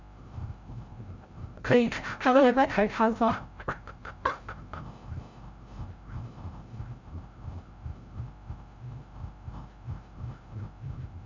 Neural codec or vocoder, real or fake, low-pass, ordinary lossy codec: codec, 16 kHz, 0.5 kbps, FreqCodec, larger model; fake; 7.2 kHz; MP3, 48 kbps